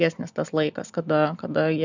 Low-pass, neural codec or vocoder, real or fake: 7.2 kHz; none; real